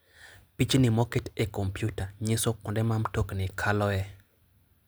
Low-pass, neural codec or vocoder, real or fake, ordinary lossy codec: none; none; real; none